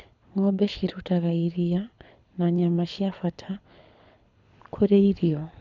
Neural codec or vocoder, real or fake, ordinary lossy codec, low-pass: codec, 24 kHz, 6 kbps, HILCodec; fake; none; 7.2 kHz